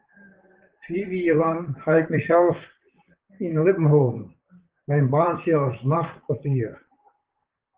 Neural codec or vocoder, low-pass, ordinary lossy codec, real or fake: vocoder, 44.1 kHz, 128 mel bands, Pupu-Vocoder; 3.6 kHz; Opus, 16 kbps; fake